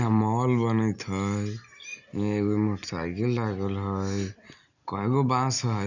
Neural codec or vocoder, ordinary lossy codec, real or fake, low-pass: none; none; real; 7.2 kHz